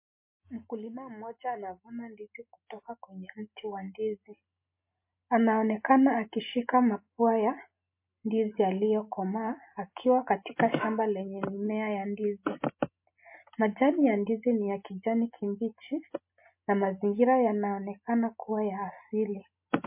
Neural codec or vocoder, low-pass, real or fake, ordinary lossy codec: none; 3.6 kHz; real; MP3, 24 kbps